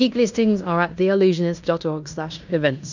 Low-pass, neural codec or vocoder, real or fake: 7.2 kHz; codec, 16 kHz in and 24 kHz out, 0.9 kbps, LongCat-Audio-Codec, fine tuned four codebook decoder; fake